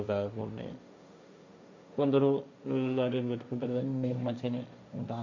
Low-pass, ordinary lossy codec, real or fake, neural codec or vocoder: 7.2 kHz; MP3, 48 kbps; fake; codec, 16 kHz, 1.1 kbps, Voila-Tokenizer